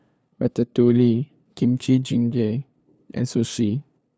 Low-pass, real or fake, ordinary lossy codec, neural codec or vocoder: none; fake; none; codec, 16 kHz, 2 kbps, FunCodec, trained on LibriTTS, 25 frames a second